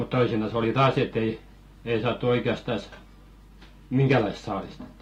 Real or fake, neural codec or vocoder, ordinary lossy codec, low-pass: real; none; AAC, 48 kbps; 19.8 kHz